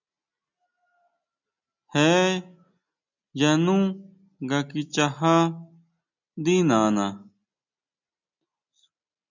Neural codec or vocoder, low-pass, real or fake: none; 7.2 kHz; real